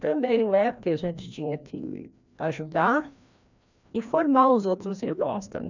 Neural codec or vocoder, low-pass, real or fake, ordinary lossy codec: codec, 16 kHz, 1 kbps, FreqCodec, larger model; 7.2 kHz; fake; none